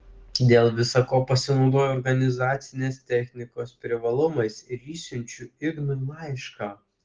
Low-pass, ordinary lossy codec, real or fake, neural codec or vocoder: 7.2 kHz; Opus, 16 kbps; real; none